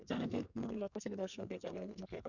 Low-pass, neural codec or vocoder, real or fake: 7.2 kHz; codec, 32 kHz, 1.9 kbps, SNAC; fake